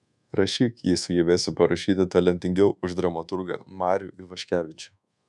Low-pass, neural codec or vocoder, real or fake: 10.8 kHz; codec, 24 kHz, 1.2 kbps, DualCodec; fake